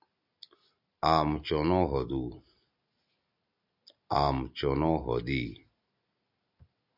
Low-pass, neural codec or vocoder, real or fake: 5.4 kHz; none; real